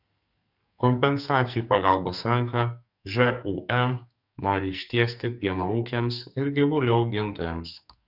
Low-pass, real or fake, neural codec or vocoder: 5.4 kHz; fake; codec, 44.1 kHz, 2.6 kbps, SNAC